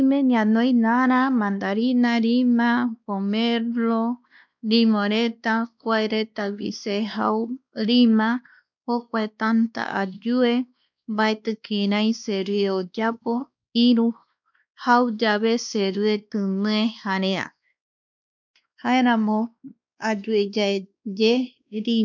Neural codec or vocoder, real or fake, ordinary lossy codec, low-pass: codec, 16 kHz, 2 kbps, X-Codec, WavLM features, trained on Multilingual LibriSpeech; fake; none; 7.2 kHz